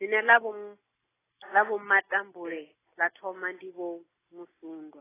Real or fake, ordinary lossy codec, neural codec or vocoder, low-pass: real; AAC, 16 kbps; none; 3.6 kHz